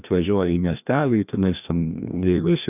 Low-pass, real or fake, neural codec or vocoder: 3.6 kHz; fake; codec, 16 kHz, 1 kbps, FreqCodec, larger model